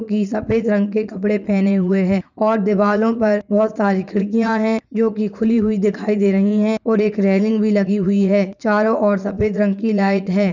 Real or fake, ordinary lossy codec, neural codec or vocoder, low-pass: fake; none; vocoder, 44.1 kHz, 80 mel bands, Vocos; 7.2 kHz